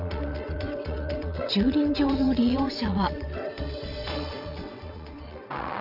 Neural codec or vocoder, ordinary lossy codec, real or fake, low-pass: vocoder, 22.05 kHz, 80 mel bands, WaveNeXt; none; fake; 5.4 kHz